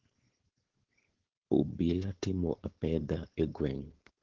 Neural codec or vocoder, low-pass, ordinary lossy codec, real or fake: codec, 16 kHz, 4.8 kbps, FACodec; 7.2 kHz; Opus, 16 kbps; fake